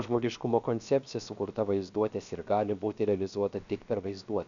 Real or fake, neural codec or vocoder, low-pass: fake; codec, 16 kHz, 0.7 kbps, FocalCodec; 7.2 kHz